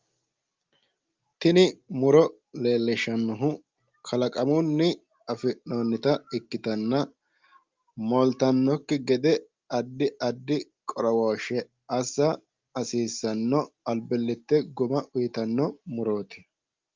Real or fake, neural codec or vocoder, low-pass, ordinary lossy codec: real; none; 7.2 kHz; Opus, 32 kbps